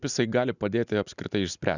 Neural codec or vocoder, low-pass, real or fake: none; 7.2 kHz; real